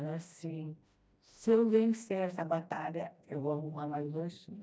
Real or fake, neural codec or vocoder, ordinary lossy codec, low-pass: fake; codec, 16 kHz, 1 kbps, FreqCodec, smaller model; none; none